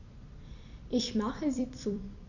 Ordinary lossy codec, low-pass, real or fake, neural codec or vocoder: none; 7.2 kHz; fake; vocoder, 44.1 kHz, 128 mel bands every 256 samples, BigVGAN v2